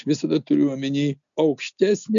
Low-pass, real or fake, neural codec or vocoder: 7.2 kHz; real; none